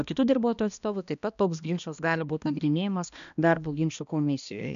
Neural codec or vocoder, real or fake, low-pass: codec, 16 kHz, 1 kbps, X-Codec, HuBERT features, trained on balanced general audio; fake; 7.2 kHz